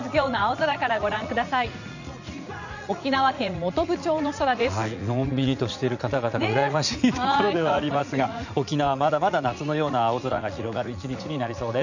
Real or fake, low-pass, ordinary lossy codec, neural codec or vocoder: fake; 7.2 kHz; none; vocoder, 44.1 kHz, 80 mel bands, Vocos